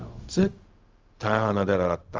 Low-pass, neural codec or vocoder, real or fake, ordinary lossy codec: 7.2 kHz; codec, 16 kHz, 0.4 kbps, LongCat-Audio-Codec; fake; Opus, 32 kbps